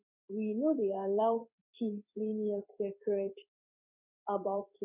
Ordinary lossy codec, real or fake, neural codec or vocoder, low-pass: none; fake; codec, 16 kHz in and 24 kHz out, 1 kbps, XY-Tokenizer; 3.6 kHz